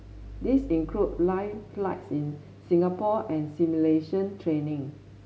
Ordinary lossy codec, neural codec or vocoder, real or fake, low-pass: none; none; real; none